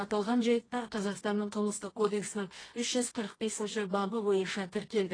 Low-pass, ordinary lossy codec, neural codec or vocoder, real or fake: 9.9 kHz; AAC, 32 kbps; codec, 24 kHz, 0.9 kbps, WavTokenizer, medium music audio release; fake